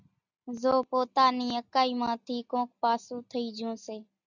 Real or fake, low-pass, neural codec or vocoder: real; 7.2 kHz; none